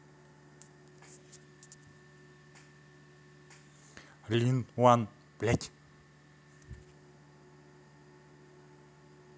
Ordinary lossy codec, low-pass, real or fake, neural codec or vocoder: none; none; real; none